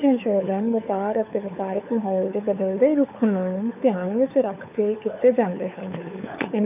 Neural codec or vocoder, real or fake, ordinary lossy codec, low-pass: codec, 16 kHz, 4 kbps, FunCodec, trained on LibriTTS, 50 frames a second; fake; none; 3.6 kHz